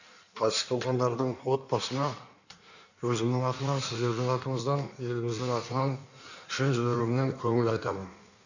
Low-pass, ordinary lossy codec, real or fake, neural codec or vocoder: 7.2 kHz; none; fake; codec, 16 kHz in and 24 kHz out, 1.1 kbps, FireRedTTS-2 codec